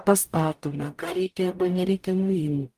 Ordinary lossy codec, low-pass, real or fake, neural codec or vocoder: Opus, 32 kbps; 14.4 kHz; fake; codec, 44.1 kHz, 0.9 kbps, DAC